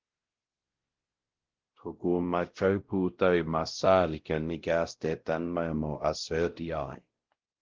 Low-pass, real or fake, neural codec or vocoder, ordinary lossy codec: 7.2 kHz; fake; codec, 16 kHz, 0.5 kbps, X-Codec, WavLM features, trained on Multilingual LibriSpeech; Opus, 16 kbps